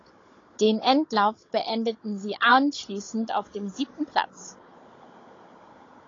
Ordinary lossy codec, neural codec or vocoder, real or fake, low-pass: AAC, 32 kbps; codec, 16 kHz, 16 kbps, FunCodec, trained on Chinese and English, 50 frames a second; fake; 7.2 kHz